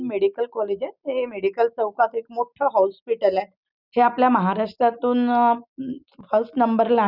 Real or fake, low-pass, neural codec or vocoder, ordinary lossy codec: real; 5.4 kHz; none; none